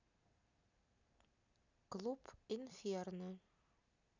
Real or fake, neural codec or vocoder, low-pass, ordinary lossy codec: real; none; 7.2 kHz; none